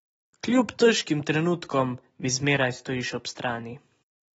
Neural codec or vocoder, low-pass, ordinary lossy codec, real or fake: none; 10.8 kHz; AAC, 24 kbps; real